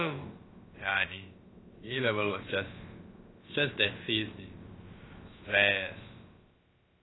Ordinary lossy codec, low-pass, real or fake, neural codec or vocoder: AAC, 16 kbps; 7.2 kHz; fake; codec, 16 kHz, about 1 kbps, DyCAST, with the encoder's durations